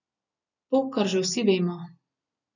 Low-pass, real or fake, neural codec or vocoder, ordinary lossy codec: 7.2 kHz; real; none; none